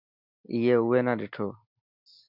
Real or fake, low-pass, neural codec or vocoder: real; 5.4 kHz; none